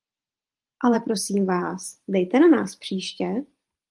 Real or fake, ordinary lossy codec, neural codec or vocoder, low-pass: fake; Opus, 24 kbps; vocoder, 44.1 kHz, 128 mel bands every 512 samples, BigVGAN v2; 10.8 kHz